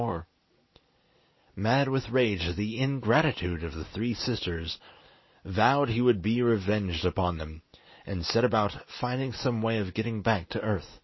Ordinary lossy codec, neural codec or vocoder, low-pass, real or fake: MP3, 24 kbps; none; 7.2 kHz; real